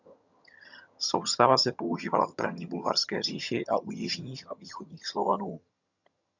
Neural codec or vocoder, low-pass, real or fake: vocoder, 22.05 kHz, 80 mel bands, HiFi-GAN; 7.2 kHz; fake